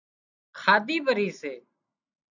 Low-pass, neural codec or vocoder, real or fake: 7.2 kHz; none; real